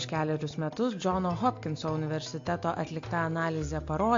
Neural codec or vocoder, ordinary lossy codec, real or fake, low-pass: none; MP3, 48 kbps; real; 7.2 kHz